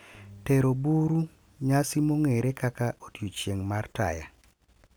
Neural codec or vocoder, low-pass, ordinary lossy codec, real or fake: none; none; none; real